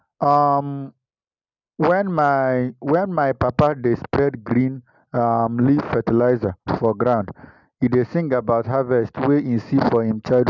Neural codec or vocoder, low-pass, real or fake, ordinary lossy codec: none; 7.2 kHz; real; none